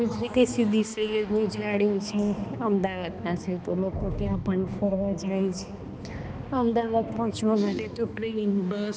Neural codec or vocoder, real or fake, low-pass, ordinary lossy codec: codec, 16 kHz, 2 kbps, X-Codec, HuBERT features, trained on balanced general audio; fake; none; none